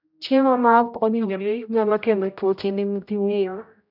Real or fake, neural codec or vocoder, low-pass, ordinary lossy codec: fake; codec, 16 kHz, 0.5 kbps, X-Codec, HuBERT features, trained on general audio; 5.4 kHz; none